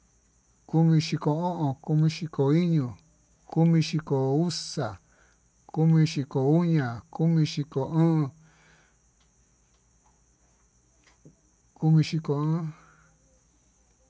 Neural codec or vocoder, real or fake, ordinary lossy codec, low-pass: none; real; none; none